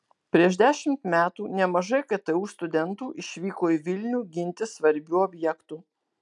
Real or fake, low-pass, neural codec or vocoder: real; 10.8 kHz; none